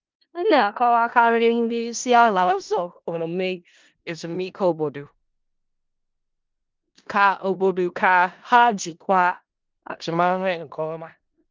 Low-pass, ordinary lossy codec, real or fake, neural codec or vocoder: 7.2 kHz; Opus, 32 kbps; fake; codec, 16 kHz in and 24 kHz out, 0.4 kbps, LongCat-Audio-Codec, four codebook decoder